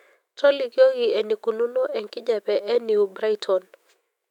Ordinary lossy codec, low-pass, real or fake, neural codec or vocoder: MP3, 96 kbps; 19.8 kHz; fake; autoencoder, 48 kHz, 128 numbers a frame, DAC-VAE, trained on Japanese speech